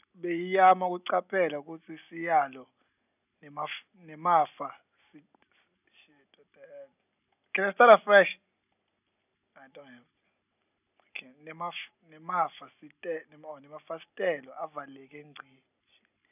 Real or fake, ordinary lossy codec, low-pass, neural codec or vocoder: real; none; 3.6 kHz; none